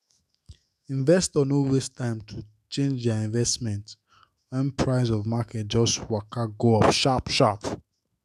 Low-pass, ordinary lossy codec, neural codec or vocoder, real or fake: 14.4 kHz; none; autoencoder, 48 kHz, 128 numbers a frame, DAC-VAE, trained on Japanese speech; fake